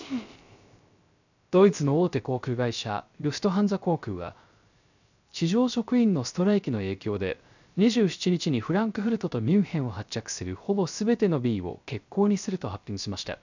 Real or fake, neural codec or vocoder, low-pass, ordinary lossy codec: fake; codec, 16 kHz, 0.3 kbps, FocalCodec; 7.2 kHz; none